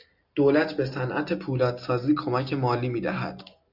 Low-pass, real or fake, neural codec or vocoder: 5.4 kHz; real; none